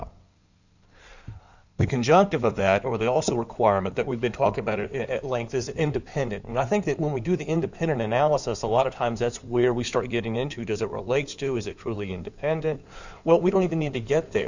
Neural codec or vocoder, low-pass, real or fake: codec, 16 kHz in and 24 kHz out, 2.2 kbps, FireRedTTS-2 codec; 7.2 kHz; fake